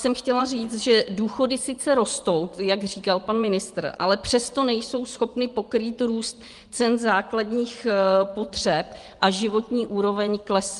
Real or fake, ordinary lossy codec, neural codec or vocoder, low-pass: fake; Opus, 32 kbps; vocoder, 24 kHz, 100 mel bands, Vocos; 10.8 kHz